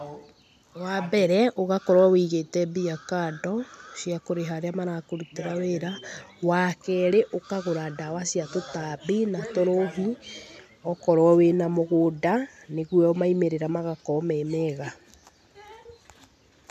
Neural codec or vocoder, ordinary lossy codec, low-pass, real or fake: none; none; 14.4 kHz; real